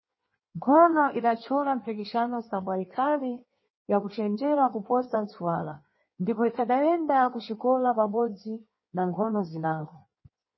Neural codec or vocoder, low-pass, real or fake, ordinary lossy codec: codec, 16 kHz in and 24 kHz out, 1.1 kbps, FireRedTTS-2 codec; 7.2 kHz; fake; MP3, 24 kbps